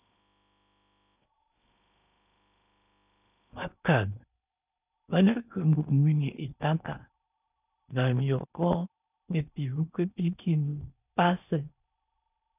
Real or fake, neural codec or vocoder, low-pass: fake; codec, 16 kHz in and 24 kHz out, 0.8 kbps, FocalCodec, streaming, 65536 codes; 3.6 kHz